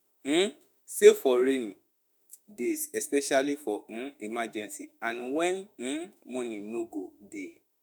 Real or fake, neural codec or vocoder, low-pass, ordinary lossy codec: fake; autoencoder, 48 kHz, 32 numbers a frame, DAC-VAE, trained on Japanese speech; none; none